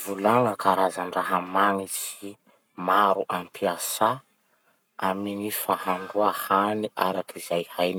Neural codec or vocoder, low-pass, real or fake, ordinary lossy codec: codec, 44.1 kHz, 7.8 kbps, Pupu-Codec; none; fake; none